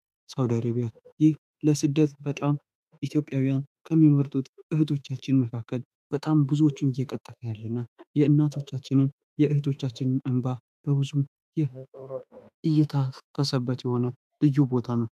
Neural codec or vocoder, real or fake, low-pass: autoencoder, 48 kHz, 32 numbers a frame, DAC-VAE, trained on Japanese speech; fake; 14.4 kHz